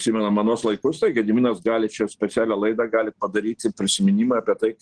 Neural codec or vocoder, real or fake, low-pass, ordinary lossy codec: none; real; 9.9 kHz; Opus, 16 kbps